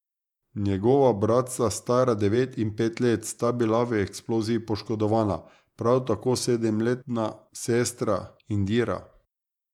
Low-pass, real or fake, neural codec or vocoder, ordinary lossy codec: 19.8 kHz; fake; vocoder, 48 kHz, 128 mel bands, Vocos; none